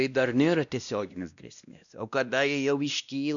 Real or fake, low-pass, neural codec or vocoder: fake; 7.2 kHz; codec, 16 kHz, 1 kbps, X-Codec, WavLM features, trained on Multilingual LibriSpeech